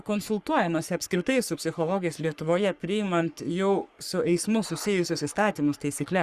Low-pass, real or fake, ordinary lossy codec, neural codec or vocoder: 14.4 kHz; fake; Opus, 64 kbps; codec, 44.1 kHz, 3.4 kbps, Pupu-Codec